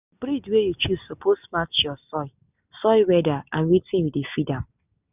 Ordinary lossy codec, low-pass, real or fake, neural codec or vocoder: none; 3.6 kHz; real; none